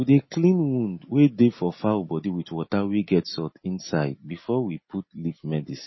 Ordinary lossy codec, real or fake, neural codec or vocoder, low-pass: MP3, 24 kbps; real; none; 7.2 kHz